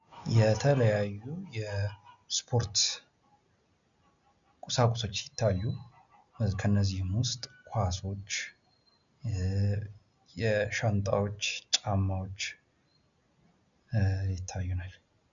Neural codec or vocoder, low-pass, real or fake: none; 7.2 kHz; real